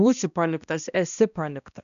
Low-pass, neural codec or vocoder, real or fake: 7.2 kHz; codec, 16 kHz, 1 kbps, X-Codec, HuBERT features, trained on balanced general audio; fake